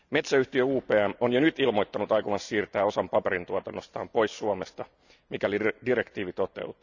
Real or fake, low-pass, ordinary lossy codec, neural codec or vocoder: real; 7.2 kHz; none; none